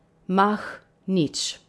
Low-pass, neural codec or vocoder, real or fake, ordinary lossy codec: none; none; real; none